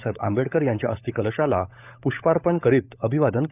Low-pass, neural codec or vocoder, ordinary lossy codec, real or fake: 3.6 kHz; codec, 16 kHz, 16 kbps, FunCodec, trained on LibriTTS, 50 frames a second; none; fake